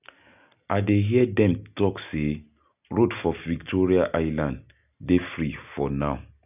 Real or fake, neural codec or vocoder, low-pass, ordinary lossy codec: real; none; 3.6 kHz; none